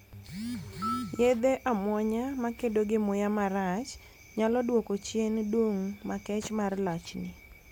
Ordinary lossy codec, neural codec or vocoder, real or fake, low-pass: none; none; real; none